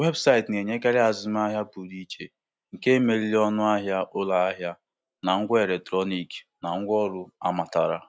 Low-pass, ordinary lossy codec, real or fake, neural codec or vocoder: none; none; real; none